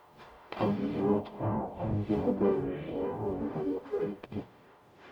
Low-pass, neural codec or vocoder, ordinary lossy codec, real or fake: 19.8 kHz; codec, 44.1 kHz, 0.9 kbps, DAC; none; fake